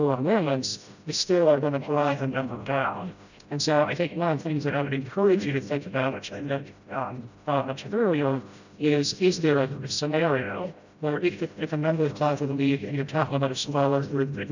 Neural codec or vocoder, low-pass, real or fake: codec, 16 kHz, 0.5 kbps, FreqCodec, smaller model; 7.2 kHz; fake